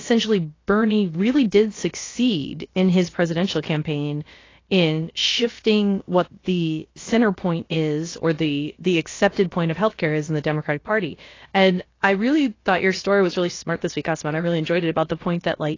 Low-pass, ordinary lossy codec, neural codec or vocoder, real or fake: 7.2 kHz; AAC, 32 kbps; codec, 16 kHz, about 1 kbps, DyCAST, with the encoder's durations; fake